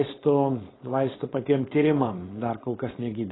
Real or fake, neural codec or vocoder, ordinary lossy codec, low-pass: fake; codec, 16 kHz, 4.8 kbps, FACodec; AAC, 16 kbps; 7.2 kHz